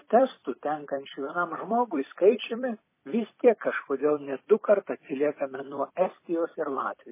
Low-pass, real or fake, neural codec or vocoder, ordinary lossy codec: 3.6 kHz; fake; vocoder, 44.1 kHz, 128 mel bands every 256 samples, BigVGAN v2; MP3, 16 kbps